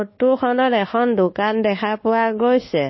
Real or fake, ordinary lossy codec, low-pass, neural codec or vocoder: fake; MP3, 24 kbps; 7.2 kHz; codec, 24 kHz, 1.2 kbps, DualCodec